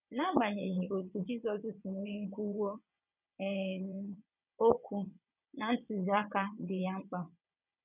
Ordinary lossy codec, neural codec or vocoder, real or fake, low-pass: none; vocoder, 22.05 kHz, 80 mel bands, WaveNeXt; fake; 3.6 kHz